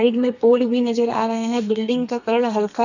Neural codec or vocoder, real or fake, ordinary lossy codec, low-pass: codec, 32 kHz, 1.9 kbps, SNAC; fake; none; 7.2 kHz